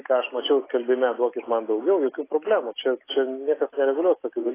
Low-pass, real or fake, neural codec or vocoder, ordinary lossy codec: 3.6 kHz; real; none; AAC, 16 kbps